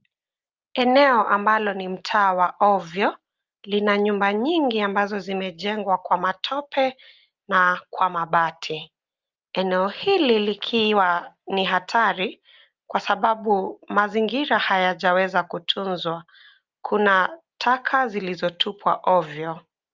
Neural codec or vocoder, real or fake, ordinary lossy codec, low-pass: none; real; Opus, 24 kbps; 7.2 kHz